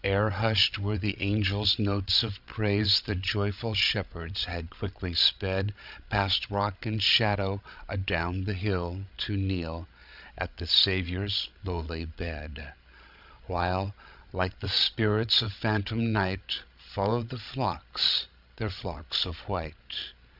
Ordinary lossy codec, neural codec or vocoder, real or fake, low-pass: Opus, 64 kbps; codec, 16 kHz, 16 kbps, FreqCodec, larger model; fake; 5.4 kHz